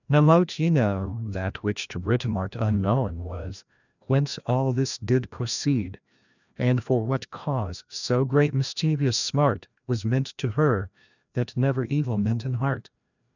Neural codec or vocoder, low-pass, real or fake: codec, 16 kHz, 1 kbps, FreqCodec, larger model; 7.2 kHz; fake